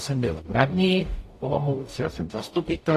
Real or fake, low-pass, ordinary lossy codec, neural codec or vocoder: fake; 14.4 kHz; AAC, 48 kbps; codec, 44.1 kHz, 0.9 kbps, DAC